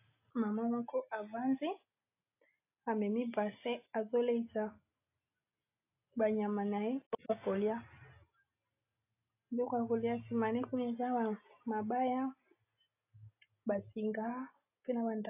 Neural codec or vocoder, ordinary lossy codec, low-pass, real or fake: none; AAC, 24 kbps; 3.6 kHz; real